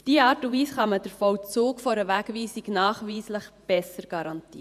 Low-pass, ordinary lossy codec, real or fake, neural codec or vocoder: 14.4 kHz; none; fake; vocoder, 48 kHz, 128 mel bands, Vocos